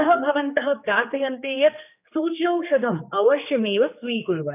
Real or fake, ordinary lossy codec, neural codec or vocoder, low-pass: fake; none; codec, 16 kHz, 2 kbps, X-Codec, HuBERT features, trained on general audio; 3.6 kHz